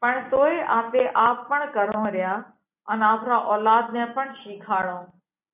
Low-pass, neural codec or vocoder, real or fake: 3.6 kHz; none; real